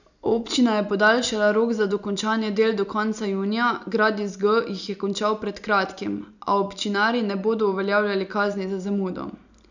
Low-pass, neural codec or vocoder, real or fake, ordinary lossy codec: 7.2 kHz; none; real; none